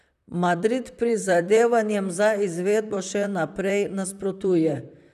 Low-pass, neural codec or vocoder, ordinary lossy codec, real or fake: 14.4 kHz; vocoder, 44.1 kHz, 128 mel bands, Pupu-Vocoder; none; fake